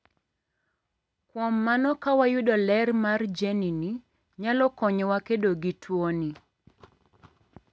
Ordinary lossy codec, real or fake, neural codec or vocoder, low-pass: none; real; none; none